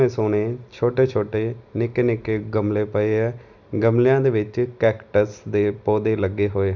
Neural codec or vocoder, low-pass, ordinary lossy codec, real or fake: none; 7.2 kHz; none; real